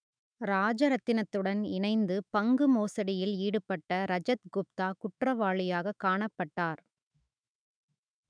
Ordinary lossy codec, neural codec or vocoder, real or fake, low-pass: none; autoencoder, 48 kHz, 128 numbers a frame, DAC-VAE, trained on Japanese speech; fake; 9.9 kHz